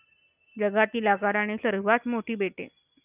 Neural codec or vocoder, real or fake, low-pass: none; real; 3.6 kHz